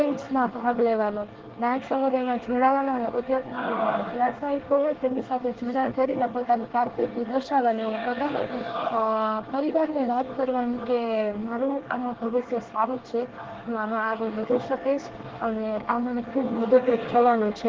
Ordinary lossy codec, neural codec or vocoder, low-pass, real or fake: Opus, 16 kbps; codec, 24 kHz, 1 kbps, SNAC; 7.2 kHz; fake